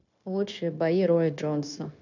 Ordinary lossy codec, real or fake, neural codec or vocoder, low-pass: none; fake; codec, 16 kHz, 0.9 kbps, LongCat-Audio-Codec; 7.2 kHz